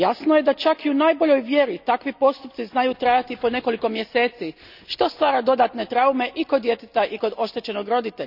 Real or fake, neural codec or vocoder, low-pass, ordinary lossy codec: real; none; 5.4 kHz; none